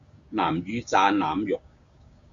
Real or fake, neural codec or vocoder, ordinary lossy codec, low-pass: fake; codec, 16 kHz, 6 kbps, DAC; Opus, 64 kbps; 7.2 kHz